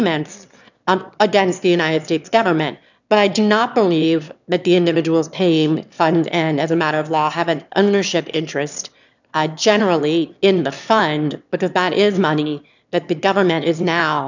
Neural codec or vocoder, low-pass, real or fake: autoencoder, 22.05 kHz, a latent of 192 numbers a frame, VITS, trained on one speaker; 7.2 kHz; fake